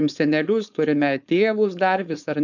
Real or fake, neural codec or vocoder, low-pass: fake; codec, 16 kHz, 4.8 kbps, FACodec; 7.2 kHz